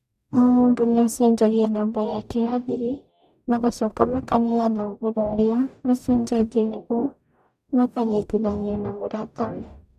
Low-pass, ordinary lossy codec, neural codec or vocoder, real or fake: 14.4 kHz; none; codec, 44.1 kHz, 0.9 kbps, DAC; fake